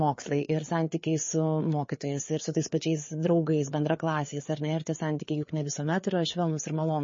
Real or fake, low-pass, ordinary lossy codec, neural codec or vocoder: fake; 7.2 kHz; MP3, 32 kbps; codec, 16 kHz, 4 kbps, FreqCodec, larger model